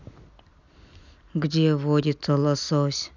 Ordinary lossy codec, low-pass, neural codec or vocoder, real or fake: none; 7.2 kHz; none; real